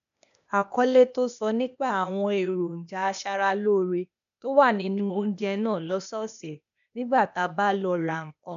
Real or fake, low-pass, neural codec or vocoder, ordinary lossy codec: fake; 7.2 kHz; codec, 16 kHz, 0.8 kbps, ZipCodec; none